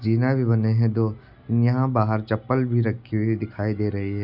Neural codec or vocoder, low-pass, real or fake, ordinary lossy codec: none; 5.4 kHz; real; none